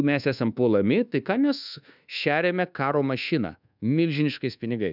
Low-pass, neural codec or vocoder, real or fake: 5.4 kHz; codec, 24 kHz, 1.2 kbps, DualCodec; fake